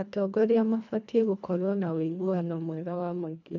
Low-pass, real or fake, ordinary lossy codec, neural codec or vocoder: 7.2 kHz; fake; none; codec, 24 kHz, 1.5 kbps, HILCodec